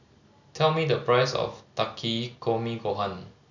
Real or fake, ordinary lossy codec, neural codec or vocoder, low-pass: real; none; none; 7.2 kHz